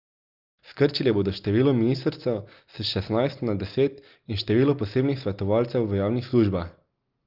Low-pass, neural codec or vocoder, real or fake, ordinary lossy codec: 5.4 kHz; none; real; Opus, 24 kbps